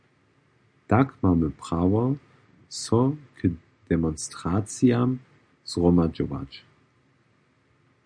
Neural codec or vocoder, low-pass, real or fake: none; 9.9 kHz; real